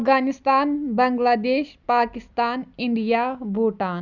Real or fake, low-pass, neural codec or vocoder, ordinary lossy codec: real; 7.2 kHz; none; none